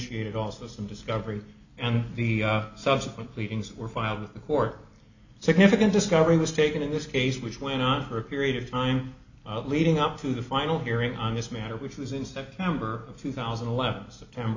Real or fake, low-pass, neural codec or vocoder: real; 7.2 kHz; none